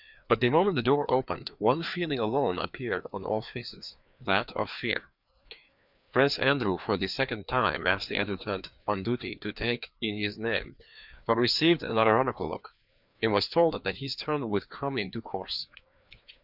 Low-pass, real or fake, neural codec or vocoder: 5.4 kHz; fake; codec, 16 kHz, 2 kbps, FreqCodec, larger model